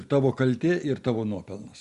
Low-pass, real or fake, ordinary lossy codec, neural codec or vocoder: 10.8 kHz; real; Opus, 64 kbps; none